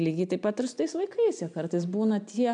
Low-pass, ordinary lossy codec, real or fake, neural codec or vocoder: 9.9 kHz; MP3, 96 kbps; real; none